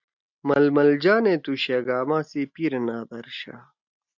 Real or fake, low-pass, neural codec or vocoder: real; 7.2 kHz; none